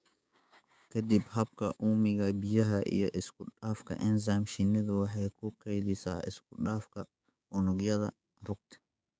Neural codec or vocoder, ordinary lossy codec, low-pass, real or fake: codec, 16 kHz, 6 kbps, DAC; none; none; fake